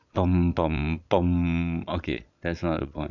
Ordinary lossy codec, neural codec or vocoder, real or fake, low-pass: none; vocoder, 22.05 kHz, 80 mel bands, Vocos; fake; 7.2 kHz